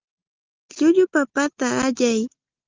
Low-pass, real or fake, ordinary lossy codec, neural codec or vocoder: 7.2 kHz; real; Opus, 24 kbps; none